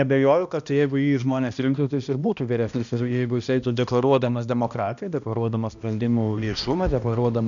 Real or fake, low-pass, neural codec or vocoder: fake; 7.2 kHz; codec, 16 kHz, 1 kbps, X-Codec, HuBERT features, trained on balanced general audio